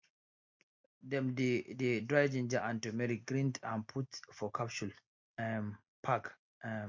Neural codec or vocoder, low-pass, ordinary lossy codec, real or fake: none; 7.2 kHz; MP3, 48 kbps; real